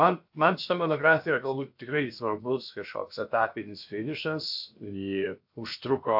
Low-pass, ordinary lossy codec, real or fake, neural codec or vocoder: 5.4 kHz; AAC, 48 kbps; fake; codec, 16 kHz, about 1 kbps, DyCAST, with the encoder's durations